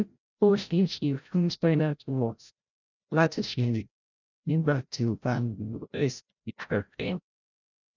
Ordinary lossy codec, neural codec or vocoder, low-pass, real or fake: none; codec, 16 kHz, 0.5 kbps, FreqCodec, larger model; 7.2 kHz; fake